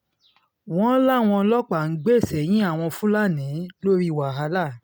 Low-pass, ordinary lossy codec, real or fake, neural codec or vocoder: none; none; real; none